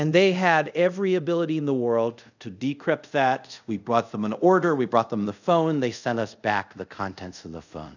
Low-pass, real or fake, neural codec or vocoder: 7.2 kHz; fake; codec, 24 kHz, 0.5 kbps, DualCodec